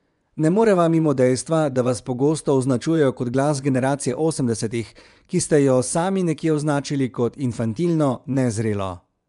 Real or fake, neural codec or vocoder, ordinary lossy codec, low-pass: fake; vocoder, 24 kHz, 100 mel bands, Vocos; none; 10.8 kHz